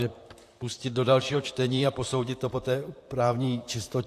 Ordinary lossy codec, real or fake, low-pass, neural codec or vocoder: AAC, 64 kbps; fake; 14.4 kHz; vocoder, 44.1 kHz, 128 mel bands, Pupu-Vocoder